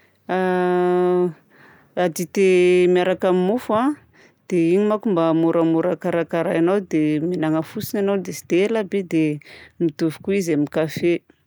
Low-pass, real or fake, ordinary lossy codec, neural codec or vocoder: none; real; none; none